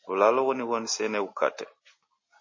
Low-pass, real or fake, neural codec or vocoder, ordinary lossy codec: 7.2 kHz; real; none; MP3, 32 kbps